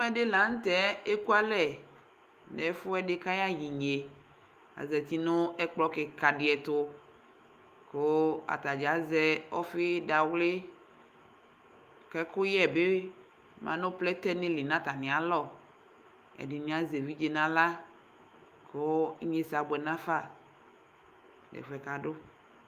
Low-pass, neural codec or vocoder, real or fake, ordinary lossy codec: 14.4 kHz; autoencoder, 48 kHz, 128 numbers a frame, DAC-VAE, trained on Japanese speech; fake; Opus, 32 kbps